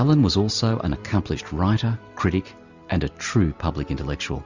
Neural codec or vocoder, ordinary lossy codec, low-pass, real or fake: none; Opus, 64 kbps; 7.2 kHz; real